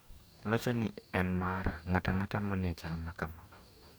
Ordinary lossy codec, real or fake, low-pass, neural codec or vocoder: none; fake; none; codec, 44.1 kHz, 2.6 kbps, DAC